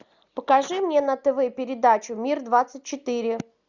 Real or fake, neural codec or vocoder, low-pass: real; none; 7.2 kHz